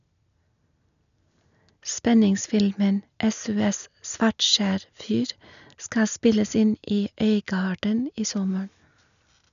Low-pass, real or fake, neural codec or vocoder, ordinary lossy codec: 7.2 kHz; real; none; none